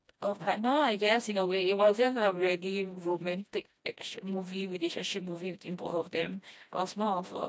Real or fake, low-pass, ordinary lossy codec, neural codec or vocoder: fake; none; none; codec, 16 kHz, 1 kbps, FreqCodec, smaller model